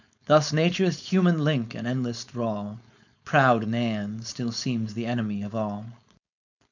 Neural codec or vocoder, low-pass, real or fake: codec, 16 kHz, 4.8 kbps, FACodec; 7.2 kHz; fake